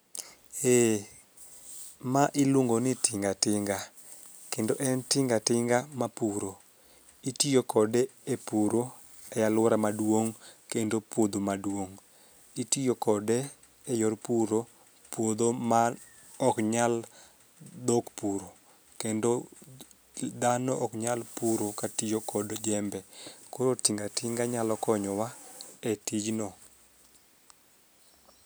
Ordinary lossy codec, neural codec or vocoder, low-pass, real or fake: none; none; none; real